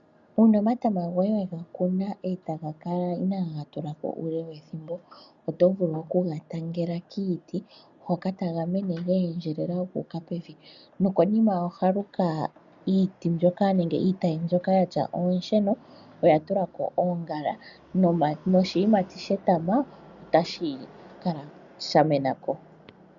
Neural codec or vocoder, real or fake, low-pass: none; real; 7.2 kHz